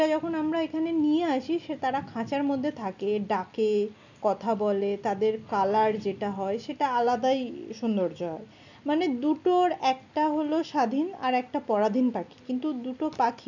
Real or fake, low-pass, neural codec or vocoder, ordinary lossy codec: real; 7.2 kHz; none; none